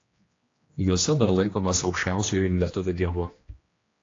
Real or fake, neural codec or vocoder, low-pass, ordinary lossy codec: fake; codec, 16 kHz, 1 kbps, X-Codec, HuBERT features, trained on general audio; 7.2 kHz; AAC, 32 kbps